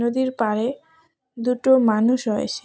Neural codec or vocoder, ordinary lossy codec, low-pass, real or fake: none; none; none; real